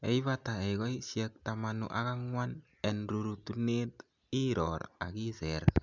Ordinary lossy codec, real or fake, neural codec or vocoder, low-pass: none; real; none; 7.2 kHz